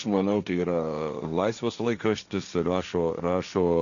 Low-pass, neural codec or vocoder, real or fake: 7.2 kHz; codec, 16 kHz, 1.1 kbps, Voila-Tokenizer; fake